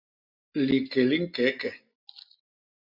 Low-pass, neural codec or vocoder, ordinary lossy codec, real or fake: 5.4 kHz; none; MP3, 48 kbps; real